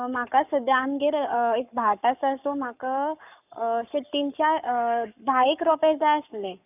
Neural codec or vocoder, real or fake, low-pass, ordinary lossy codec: codec, 44.1 kHz, 7.8 kbps, Pupu-Codec; fake; 3.6 kHz; none